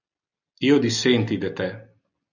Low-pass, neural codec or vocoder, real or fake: 7.2 kHz; none; real